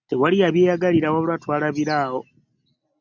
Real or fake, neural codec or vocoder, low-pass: real; none; 7.2 kHz